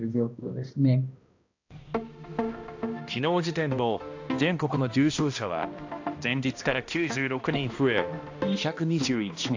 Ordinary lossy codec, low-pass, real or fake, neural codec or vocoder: AAC, 48 kbps; 7.2 kHz; fake; codec, 16 kHz, 1 kbps, X-Codec, HuBERT features, trained on balanced general audio